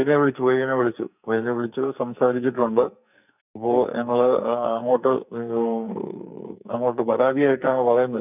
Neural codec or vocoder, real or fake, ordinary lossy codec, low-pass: codec, 44.1 kHz, 2.6 kbps, SNAC; fake; none; 3.6 kHz